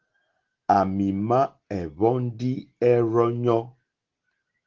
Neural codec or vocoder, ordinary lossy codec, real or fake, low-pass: none; Opus, 16 kbps; real; 7.2 kHz